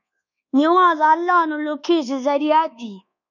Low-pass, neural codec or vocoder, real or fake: 7.2 kHz; codec, 24 kHz, 1.2 kbps, DualCodec; fake